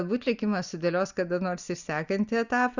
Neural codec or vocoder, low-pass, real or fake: none; 7.2 kHz; real